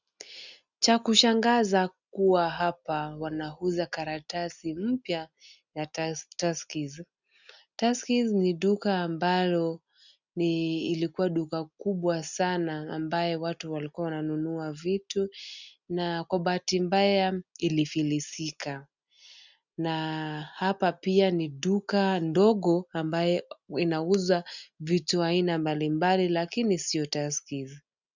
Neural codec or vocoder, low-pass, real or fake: none; 7.2 kHz; real